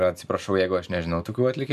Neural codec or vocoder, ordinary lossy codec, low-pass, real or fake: none; MP3, 96 kbps; 14.4 kHz; real